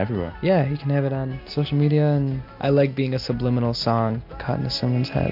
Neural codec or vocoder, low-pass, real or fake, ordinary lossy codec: none; 5.4 kHz; real; MP3, 48 kbps